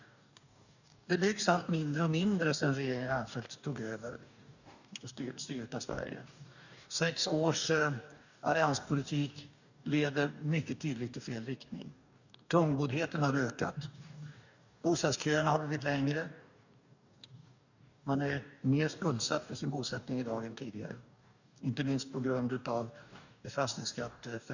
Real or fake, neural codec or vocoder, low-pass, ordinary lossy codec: fake; codec, 44.1 kHz, 2.6 kbps, DAC; 7.2 kHz; none